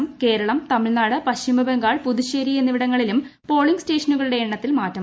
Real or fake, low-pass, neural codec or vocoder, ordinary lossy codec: real; none; none; none